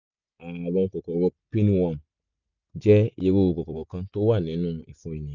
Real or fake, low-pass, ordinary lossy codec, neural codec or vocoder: real; 7.2 kHz; none; none